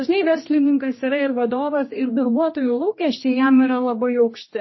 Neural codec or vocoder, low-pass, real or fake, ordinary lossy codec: codec, 16 kHz, 1 kbps, X-Codec, HuBERT features, trained on general audio; 7.2 kHz; fake; MP3, 24 kbps